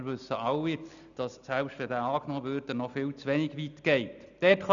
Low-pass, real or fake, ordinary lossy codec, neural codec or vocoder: 7.2 kHz; real; none; none